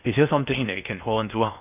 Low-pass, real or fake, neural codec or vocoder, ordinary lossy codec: 3.6 kHz; fake; codec, 16 kHz in and 24 kHz out, 0.6 kbps, FocalCodec, streaming, 2048 codes; none